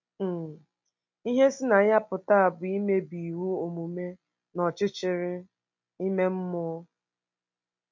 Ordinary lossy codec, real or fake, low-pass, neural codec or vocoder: MP3, 48 kbps; real; 7.2 kHz; none